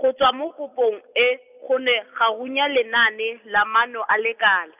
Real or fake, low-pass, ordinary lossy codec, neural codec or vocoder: real; 3.6 kHz; none; none